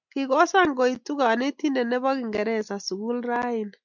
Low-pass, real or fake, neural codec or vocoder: 7.2 kHz; real; none